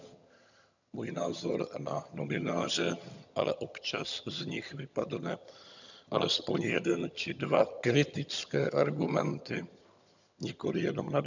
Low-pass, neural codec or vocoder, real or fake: 7.2 kHz; vocoder, 22.05 kHz, 80 mel bands, HiFi-GAN; fake